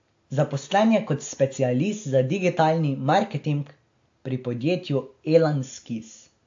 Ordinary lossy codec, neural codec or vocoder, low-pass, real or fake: AAC, 48 kbps; none; 7.2 kHz; real